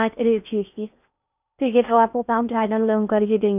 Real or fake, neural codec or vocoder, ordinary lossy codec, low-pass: fake; codec, 16 kHz in and 24 kHz out, 0.6 kbps, FocalCodec, streaming, 4096 codes; none; 3.6 kHz